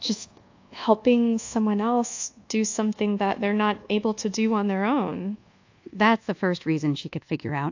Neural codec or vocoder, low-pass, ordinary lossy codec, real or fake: codec, 24 kHz, 1.2 kbps, DualCodec; 7.2 kHz; AAC, 48 kbps; fake